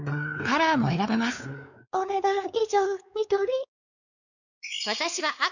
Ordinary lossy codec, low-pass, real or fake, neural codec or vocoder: none; 7.2 kHz; fake; codec, 16 kHz, 4 kbps, X-Codec, WavLM features, trained on Multilingual LibriSpeech